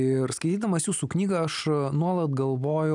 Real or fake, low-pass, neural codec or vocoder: real; 10.8 kHz; none